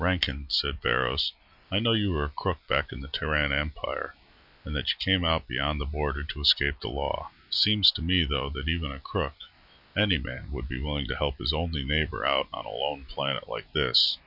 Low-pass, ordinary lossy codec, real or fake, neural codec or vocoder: 5.4 kHz; Opus, 64 kbps; real; none